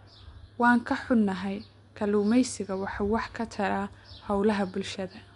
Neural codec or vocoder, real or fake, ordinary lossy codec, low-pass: none; real; MP3, 64 kbps; 10.8 kHz